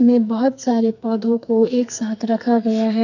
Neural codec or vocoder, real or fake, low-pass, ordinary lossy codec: codec, 44.1 kHz, 2.6 kbps, SNAC; fake; 7.2 kHz; none